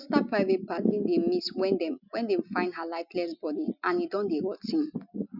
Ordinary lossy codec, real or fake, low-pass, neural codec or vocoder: none; real; 5.4 kHz; none